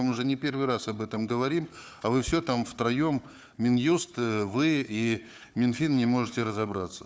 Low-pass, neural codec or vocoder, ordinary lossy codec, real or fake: none; codec, 16 kHz, 4 kbps, FunCodec, trained on Chinese and English, 50 frames a second; none; fake